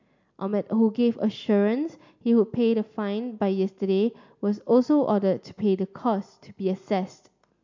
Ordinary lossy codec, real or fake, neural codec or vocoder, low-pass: MP3, 64 kbps; real; none; 7.2 kHz